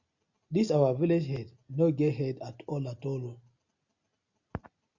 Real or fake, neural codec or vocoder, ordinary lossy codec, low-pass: real; none; Opus, 64 kbps; 7.2 kHz